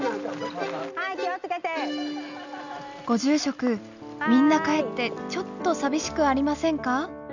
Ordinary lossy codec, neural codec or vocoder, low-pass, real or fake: none; none; 7.2 kHz; real